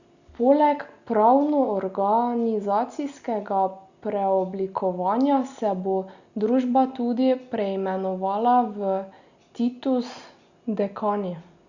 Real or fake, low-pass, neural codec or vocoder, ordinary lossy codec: real; 7.2 kHz; none; Opus, 64 kbps